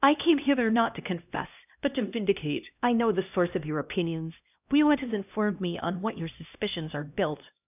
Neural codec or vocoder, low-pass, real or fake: codec, 16 kHz, 1 kbps, X-Codec, HuBERT features, trained on LibriSpeech; 3.6 kHz; fake